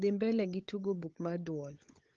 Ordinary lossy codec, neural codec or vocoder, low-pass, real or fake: Opus, 16 kbps; codec, 16 kHz, 16 kbps, FunCodec, trained on LibriTTS, 50 frames a second; 7.2 kHz; fake